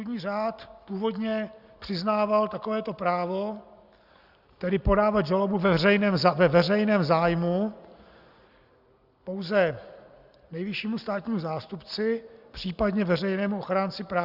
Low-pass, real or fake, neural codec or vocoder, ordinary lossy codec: 5.4 kHz; real; none; Opus, 64 kbps